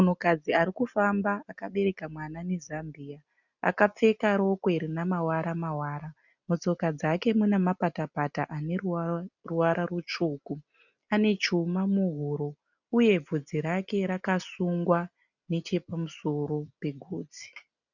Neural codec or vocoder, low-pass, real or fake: none; 7.2 kHz; real